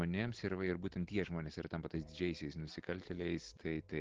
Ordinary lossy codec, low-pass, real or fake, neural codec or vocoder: Opus, 16 kbps; 7.2 kHz; real; none